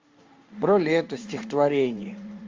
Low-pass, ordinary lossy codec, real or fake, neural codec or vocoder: 7.2 kHz; Opus, 32 kbps; fake; codec, 16 kHz in and 24 kHz out, 1 kbps, XY-Tokenizer